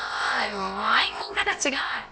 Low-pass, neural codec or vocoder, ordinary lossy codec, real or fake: none; codec, 16 kHz, about 1 kbps, DyCAST, with the encoder's durations; none; fake